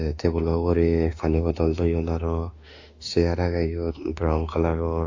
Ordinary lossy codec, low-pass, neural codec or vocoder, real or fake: AAC, 48 kbps; 7.2 kHz; autoencoder, 48 kHz, 32 numbers a frame, DAC-VAE, trained on Japanese speech; fake